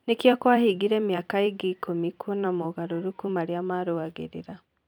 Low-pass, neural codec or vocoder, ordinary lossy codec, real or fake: 19.8 kHz; vocoder, 44.1 kHz, 128 mel bands every 256 samples, BigVGAN v2; none; fake